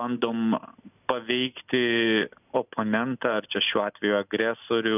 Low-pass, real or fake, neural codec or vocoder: 3.6 kHz; real; none